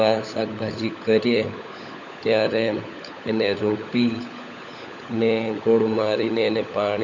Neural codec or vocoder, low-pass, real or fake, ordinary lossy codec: codec, 16 kHz, 16 kbps, FunCodec, trained on LibriTTS, 50 frames a second; 7.2 kHz; fake; none